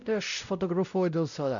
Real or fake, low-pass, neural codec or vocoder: fake; 7.2 kHz; codec, 16 kHz, 0.5 kbps, X-Codec, WavLM features, trained on Multilingual LibriSpeech